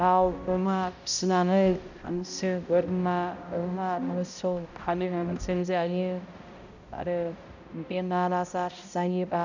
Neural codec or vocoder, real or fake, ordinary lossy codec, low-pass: codec, 16 kHz, 0.5 kbps, X-Codec, HuBERT features, trained on balanced general audio; fake; none; 7.2 kHz